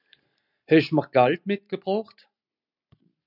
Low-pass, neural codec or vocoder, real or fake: 5.4 kHz; none; real